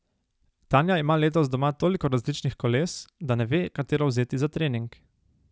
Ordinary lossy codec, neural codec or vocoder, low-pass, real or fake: none; none; none; real